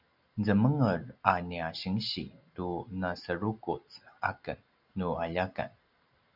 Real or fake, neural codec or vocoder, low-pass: real; none; 5.4 kHz